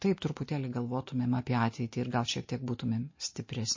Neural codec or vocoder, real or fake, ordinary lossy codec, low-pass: none; real; MP3, 32 kbps; 7.2 kHz